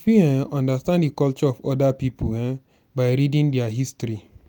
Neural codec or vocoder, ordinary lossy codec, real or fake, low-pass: none; none; real; none